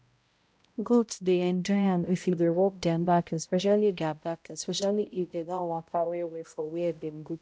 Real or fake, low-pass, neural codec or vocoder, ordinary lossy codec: fake; none; codec, 16 kHz, 0.5 kbps, X-Codec, HuBERT features, trained on balanced general audio; none